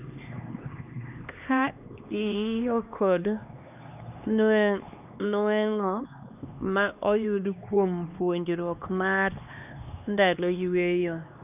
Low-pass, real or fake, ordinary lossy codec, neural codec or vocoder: 3.6 kHz; fake; none; codec, 16 kHz, 2 kbps, X-Codec, HuBERT features, trained on LibriSpeech